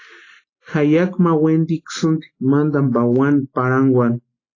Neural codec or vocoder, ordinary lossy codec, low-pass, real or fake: none; AAC, 32 kbps; 7.2 kHz; real